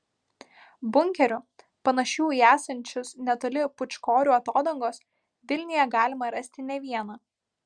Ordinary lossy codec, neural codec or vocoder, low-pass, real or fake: Opus, 64 kbps; none; 9.9 kHz; real